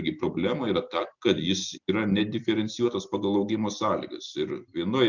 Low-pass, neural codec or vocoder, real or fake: 7.2 kHz; none; real